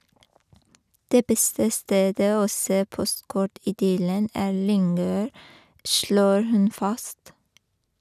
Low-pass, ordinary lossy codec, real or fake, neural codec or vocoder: 14.4 kHz; none; real; none